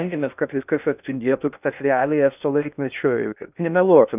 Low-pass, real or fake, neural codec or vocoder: 3.6 kHz; fake; codec, 16 kHz in and 24 kHz out, 0.6 kbps, FocalCodec, streaming, 2048 codes